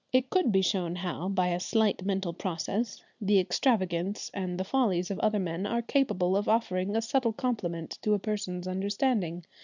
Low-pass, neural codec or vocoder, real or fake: 7.2 kHz; none; real